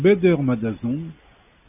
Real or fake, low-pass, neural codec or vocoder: real; 3.6 kHz; none